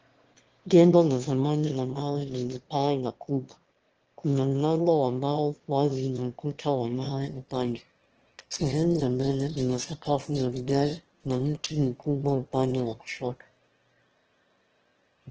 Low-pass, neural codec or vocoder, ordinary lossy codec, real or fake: 7.2 kHz; autoencoder, 22.05 kHz, a latent of 192 numbers a frame, VITS, trained on one speaker; Opus, 16 kbps; fake